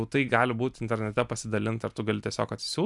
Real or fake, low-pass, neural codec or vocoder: real; 10.8 kHz; none